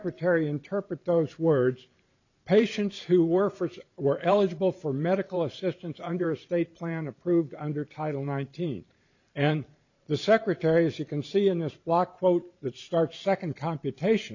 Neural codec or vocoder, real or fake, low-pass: vocoder, 44.1 kHz, 128 mel bands every 512 samples, BigVGAN v2; fake; 7.2 kHz